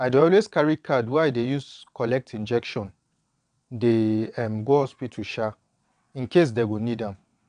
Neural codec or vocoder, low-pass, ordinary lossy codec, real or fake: vocoder, 22.05 kHz, 80 mel bands, WaveNeXt; 9.9 kHz; none; fake